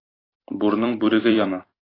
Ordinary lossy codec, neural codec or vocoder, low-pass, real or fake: AAC, 24 kbps; vocoder, 44.1 kHz, 128 mel bands every 256 samples, BigVGAN v2; 5.4 kHz; fake